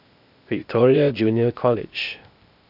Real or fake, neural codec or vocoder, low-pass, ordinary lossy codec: fake; codec, 16 kHz, 0.8 kbps, ZipCodec; 5.4 kHz; none